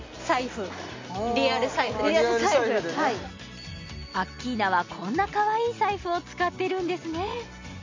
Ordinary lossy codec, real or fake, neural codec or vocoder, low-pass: MP3, 48 kbps; real; none; 7.2 kHz